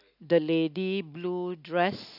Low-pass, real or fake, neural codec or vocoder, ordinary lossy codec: 5.4 kHz; real; none; none